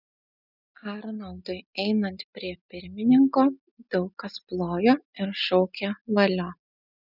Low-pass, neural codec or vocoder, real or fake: 5.4 kHz; none; real